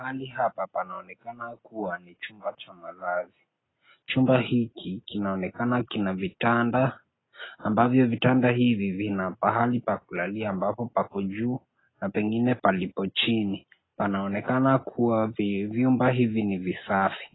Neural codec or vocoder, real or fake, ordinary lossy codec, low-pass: none; real; AAC, 16 kbps; 7.2 kHz